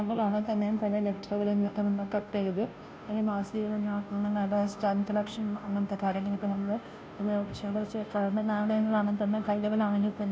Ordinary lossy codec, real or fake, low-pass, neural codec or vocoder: none; fake; none; codec, 16 kHz, 0.5 kbps, FunCodec, trained on Chinese and English, 25 frames a second